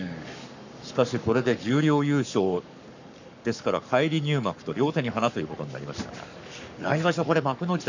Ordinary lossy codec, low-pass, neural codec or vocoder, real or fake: none; 7.2 kHz; codec, 44.1 kHz, 7.8 kbps, Pupu-Codec; fake